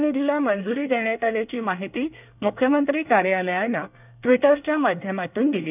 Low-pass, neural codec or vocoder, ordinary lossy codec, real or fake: 3.6 kHz; codec, 24 kHz, 1 kbps, SNAC; none; fake